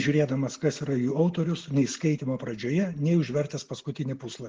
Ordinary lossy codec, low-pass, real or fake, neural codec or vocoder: Opus, 16 kbps; 7.2 kHz; real; none